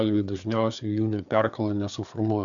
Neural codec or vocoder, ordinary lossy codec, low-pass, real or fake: codec, 16 kHz, 4 kbps, FreqCodec, larger model; MP3, 96 kbps; 7.2 kHz; fake